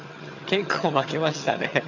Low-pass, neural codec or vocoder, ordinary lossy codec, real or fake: 7.2 kHz; vocoder, 22.05 kHz, 80 mel bands, HiFi-GAN; none; fake